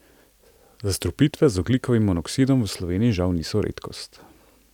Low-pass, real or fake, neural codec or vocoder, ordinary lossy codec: 19.8 kHz; real; none; none